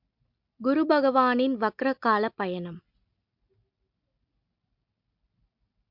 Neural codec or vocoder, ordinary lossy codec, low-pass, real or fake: none; MP3, 48 kbps; 5.4 kHz; real